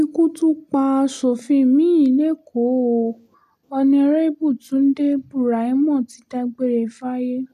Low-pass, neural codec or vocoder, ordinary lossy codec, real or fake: 14.4 kHz; none; none; real